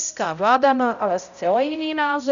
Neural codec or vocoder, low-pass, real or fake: codec, 16 kHz, 0.5 kbps, X-Codec, HuBERT features, trained on balanced general audio; 7.2 kHz; fake